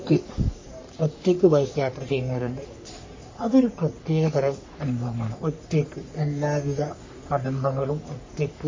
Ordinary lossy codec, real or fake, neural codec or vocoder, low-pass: MP3, 32 kbps; fake; codec, 44.1 kHz, 3.4 kbps, Pupu-Codec; 7.2 kHz